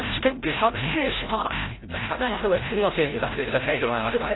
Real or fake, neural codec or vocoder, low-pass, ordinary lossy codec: fake; codec, 16 kHz, 0.5 kbps, FreqCodec, larger model; 7.2 kHz; AAC, 16 kbps